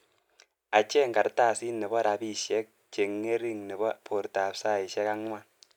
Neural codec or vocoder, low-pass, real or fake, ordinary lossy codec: none; 19.8 kHz; real; none